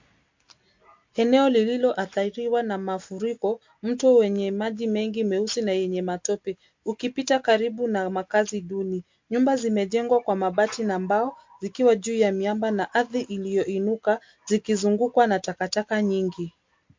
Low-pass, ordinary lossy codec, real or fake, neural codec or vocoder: 7.2 kHz; MP3, 48 kbps; real; none